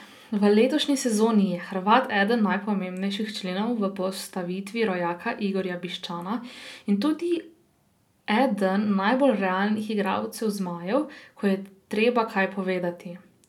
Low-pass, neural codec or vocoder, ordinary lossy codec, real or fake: 19.8 kHz; none; none; real